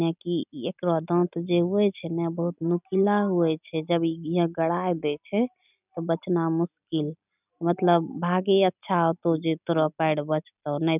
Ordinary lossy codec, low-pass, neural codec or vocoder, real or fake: none; 3.6 kHz; none; real